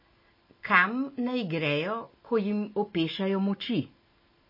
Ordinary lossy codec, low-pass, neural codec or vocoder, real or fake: MP3, 24 kbps; 5.4 kHz; none; real